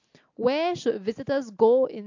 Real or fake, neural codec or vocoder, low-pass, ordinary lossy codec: real; none; 7.2 kHz; AAC, 48 kbps